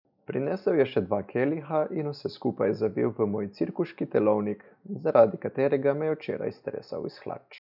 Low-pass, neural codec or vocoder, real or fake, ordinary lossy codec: 5.4 kHz; none; real; none